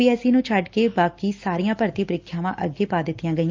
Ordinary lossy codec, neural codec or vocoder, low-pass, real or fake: Opus, 24 kbps; none; 7.2 kHz; real